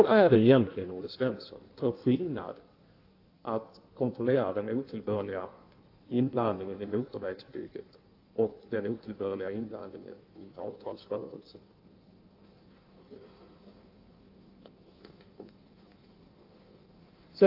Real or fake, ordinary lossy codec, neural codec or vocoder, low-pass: fake; AAC, 32 kbps; codec, 16 kHz in and 24 kHz out, 1.1 kbps, FireRedTTS-2 codec; 5.4 kHz